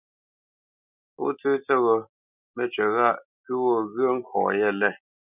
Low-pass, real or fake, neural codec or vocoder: 3.6 kHz; real; none